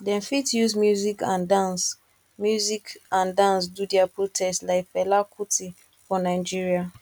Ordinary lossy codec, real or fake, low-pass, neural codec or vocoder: none; real; 19.8 kHz; none